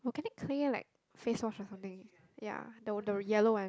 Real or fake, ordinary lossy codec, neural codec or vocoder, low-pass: real; none; none; none